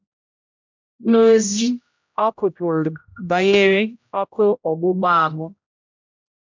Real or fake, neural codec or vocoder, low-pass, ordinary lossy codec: fake; codec, 16 kHz, 0.5 kbps, X-Codec, HuBERT features, trained on general audio; 7.2 kHz; MP3, 64 kbps